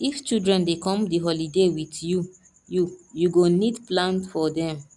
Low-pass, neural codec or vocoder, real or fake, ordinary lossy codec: 10.8 kHz; none; real; none